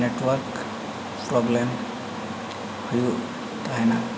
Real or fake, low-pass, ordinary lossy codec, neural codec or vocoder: real; none; none; none